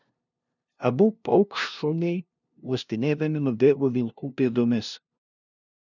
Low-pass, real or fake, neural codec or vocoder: 7.2 kHz; fake; codec, 16 kHz, 0.5 kbps, FunCodec, trained on LibriTTS, 25 frames a second